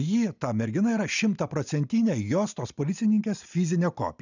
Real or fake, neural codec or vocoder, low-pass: real; none; 7.2 kHz